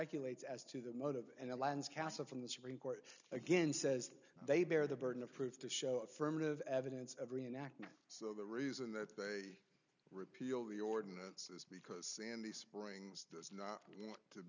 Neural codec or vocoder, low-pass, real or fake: none; 7.2 kHz; real